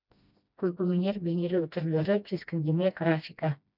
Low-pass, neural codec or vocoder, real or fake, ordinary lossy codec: 5.4 kHz; codec, 16 kHz, 1 kbps, FreqCodec, smaller model; fake; none